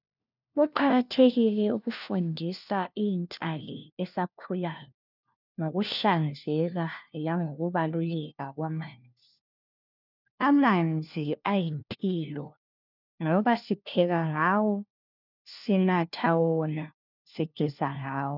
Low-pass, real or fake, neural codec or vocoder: 5.4 kHz; fake; codec, 16 kHz, 1 kbps, FunCodec, trained on LibriTTS, 50 frames a second